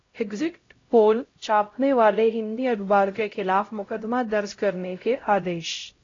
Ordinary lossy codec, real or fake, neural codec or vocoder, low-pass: AAC, 32 kbps; fake; codec, 16 kHz, 0.5 kbps, X-Codec, HuBERT features, trained on LibriSpeech; 7.2 kHz